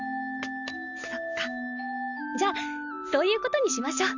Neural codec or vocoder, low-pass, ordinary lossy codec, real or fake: none; 7.2 kHz; none; real